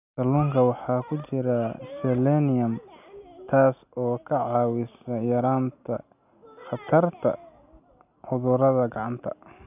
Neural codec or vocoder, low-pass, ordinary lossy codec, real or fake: none; 3.6 kHz; none; real